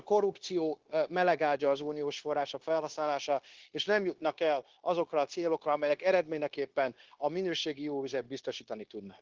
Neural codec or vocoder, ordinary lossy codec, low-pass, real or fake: codec, 16 kHz, 0.9 kbps, LongCat-Audio-Codec; Opus, 16 kbps; 7.2 kHz; fake